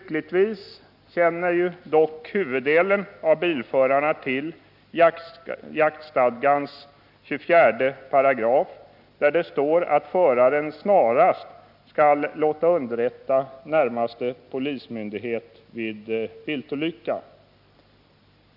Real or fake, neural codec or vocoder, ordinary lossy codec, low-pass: real; none; none; 5.4 kHz